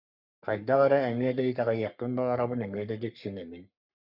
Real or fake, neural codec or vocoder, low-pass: fake; codec, 44.1 kHz, 3.4 kbps, Pupu-Codec; 5.4 kHz